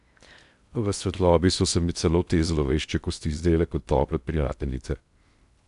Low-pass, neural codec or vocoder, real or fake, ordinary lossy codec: 10.8 kHz; codec, 16 kHz in and 24 kHz out, 0.6 kbps, FocalCodec, streaming, 2048 codes; fake; none